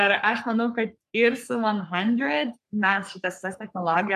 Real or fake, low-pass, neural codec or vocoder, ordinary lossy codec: fake; 14.4 kHz; codec, 44.1 kHz, 3.4 kbps, Pupu-Codec; AAC, 96 kbps